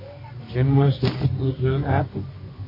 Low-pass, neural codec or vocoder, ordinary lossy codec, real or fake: 5.4 kHz; codec, 24 kHz, 0.9 kbps, WavTokenizer, medium music audio release; MP3, 24 kbps; fake